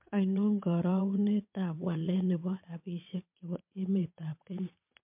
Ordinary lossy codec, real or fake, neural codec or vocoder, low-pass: MP3, 32 kbps; fake; vocoder, 22.05 kHz, 80 mel bands, WaveNeXt; 3.6 kHz